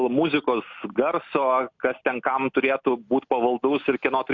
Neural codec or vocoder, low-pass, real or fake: none; 7.2 kHz; real